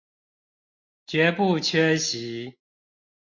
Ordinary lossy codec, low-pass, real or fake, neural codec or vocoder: MP3, 48 kbps; 7.2 kHz; real; none